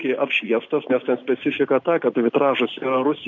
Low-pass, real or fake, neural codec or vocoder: 7.2 kHz; real; none